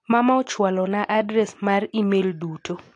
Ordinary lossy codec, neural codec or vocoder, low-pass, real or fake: AAC, 48 kbps; none; 9.9 kHz; real